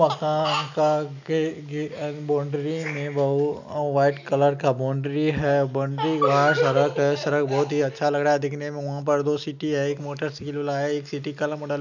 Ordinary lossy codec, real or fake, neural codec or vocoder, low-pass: none; real; none; 7.2 kHz